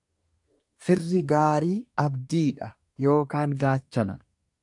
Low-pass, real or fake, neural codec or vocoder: 10.8 kHz; fake; codec, 24 kHz, 1 kbps, SNAC